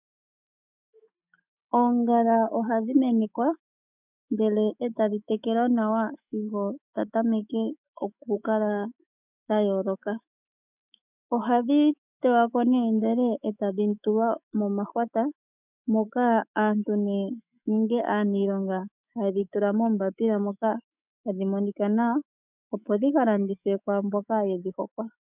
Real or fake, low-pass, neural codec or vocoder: fake; 3.6 kHz; autoencoder, 48 kHz, 128 numbers a frame, DAC-VAE, trained on Japanese speech